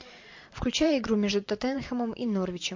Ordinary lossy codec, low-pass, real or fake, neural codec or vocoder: MP3, 48 kbps; 7.2 kHz; fake; vocoder, 44.1 kHz, 128 mel bands every 512 samples, BigVGAN v2